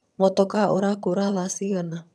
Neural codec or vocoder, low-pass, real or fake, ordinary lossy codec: vocoder, 22.05 kHz, 80 mel bands, HiFi-GAN; none; fake; none